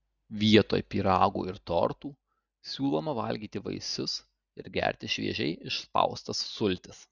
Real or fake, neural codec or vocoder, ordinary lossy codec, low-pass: real; none; Opus, 64 kbps; 7.2 kHz